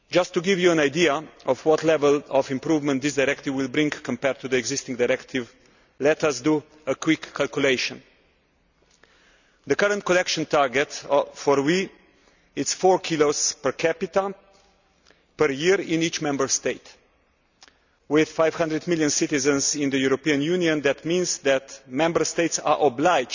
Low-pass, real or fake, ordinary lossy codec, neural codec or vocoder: 7.2 kHz; real; none; none